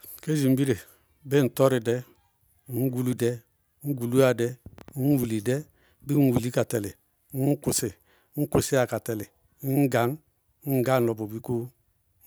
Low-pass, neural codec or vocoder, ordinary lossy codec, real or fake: none; none; none; real